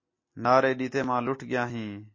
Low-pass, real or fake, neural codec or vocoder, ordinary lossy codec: 7.2 kHz; real; none; MP3, 32 kbps